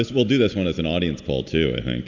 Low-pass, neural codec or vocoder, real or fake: 7.2 kHz; none; real